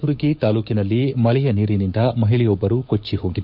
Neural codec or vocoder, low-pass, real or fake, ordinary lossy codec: codec, 16 kHz, 6 kbps, DAC; 5.4 kHz; fake; none